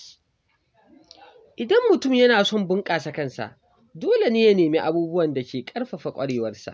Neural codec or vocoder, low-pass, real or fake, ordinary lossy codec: none; none; real; none